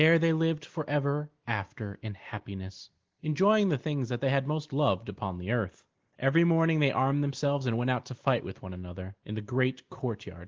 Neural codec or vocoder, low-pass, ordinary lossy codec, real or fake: none; 7.2 kHz; Opus, 16 kbps; real